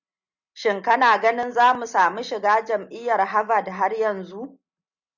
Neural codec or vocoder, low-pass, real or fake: none; 7.2 kHz; real